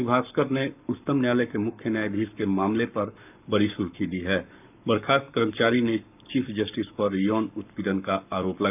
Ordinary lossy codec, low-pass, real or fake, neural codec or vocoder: none; 3.6 kHz; fake; codec, 44.1 kHz, 7.8 kbps, Pupu-Codec